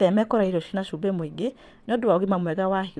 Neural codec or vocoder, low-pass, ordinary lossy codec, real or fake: vocoder, 22.05 kHz, 80 mel bands, WaveNeXt; none; none; fake